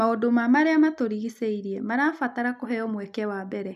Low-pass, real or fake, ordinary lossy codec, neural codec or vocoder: 14.4 kHz; real; none; none